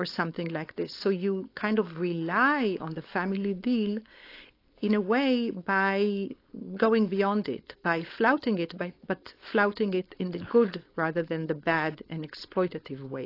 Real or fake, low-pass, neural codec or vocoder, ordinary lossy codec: fake; 5.4 kHz; codec, 16 kHz, 4.8 kbps, FACodec; AAC, 32 kbps